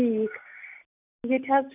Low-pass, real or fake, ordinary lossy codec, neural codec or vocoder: 3.6 kHz; real; none; none